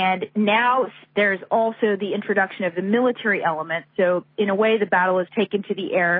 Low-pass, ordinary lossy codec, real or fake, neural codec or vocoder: 5.4 kHz; MP3, 24 kbps; real; none